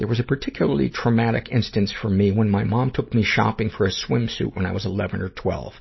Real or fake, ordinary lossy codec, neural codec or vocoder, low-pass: real; MP3, 24 kbps; none; 7.2 kHz